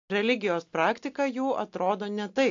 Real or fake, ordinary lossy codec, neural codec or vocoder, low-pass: real; AAC, 48 kbps; none; 7.2 kHz